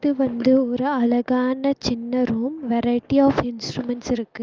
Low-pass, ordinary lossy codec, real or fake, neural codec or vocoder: 7.2 kHz; Opus, 24 kbps; real; none